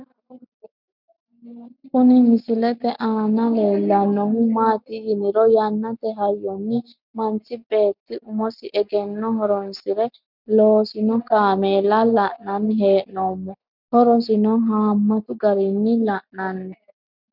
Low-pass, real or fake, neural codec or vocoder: 5.4 kHz; real; none